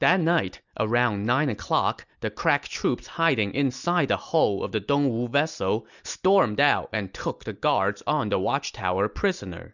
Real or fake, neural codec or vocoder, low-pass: real; none; 7.2 kHz